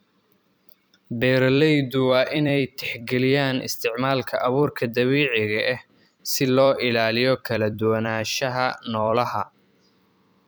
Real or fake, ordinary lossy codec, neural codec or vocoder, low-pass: fake; none; vocoder, 44.1 kHz, 128 mel bands every 256 samples, BigVGAN v2; none